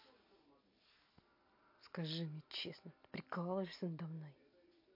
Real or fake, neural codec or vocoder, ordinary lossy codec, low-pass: real; none; MP3, 32 kbps; 5.4 kHz